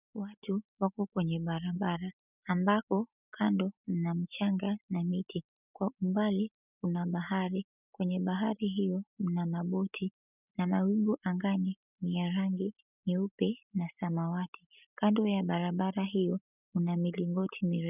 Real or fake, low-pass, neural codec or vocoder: real; 3.6 kHz; none